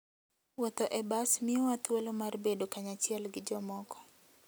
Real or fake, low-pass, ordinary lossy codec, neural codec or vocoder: real; none; none; none